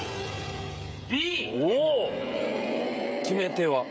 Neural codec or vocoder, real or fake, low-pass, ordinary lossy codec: codec, 16 kHz, 16 kbps, FreqCodec, smaller model; fake; none; none